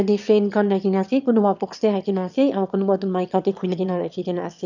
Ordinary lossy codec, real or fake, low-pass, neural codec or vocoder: none; fake; 7.2 kHz; autoencoder, 22.05 kHz, a latent of 192 numbers a frame, VITS, trained on one speaker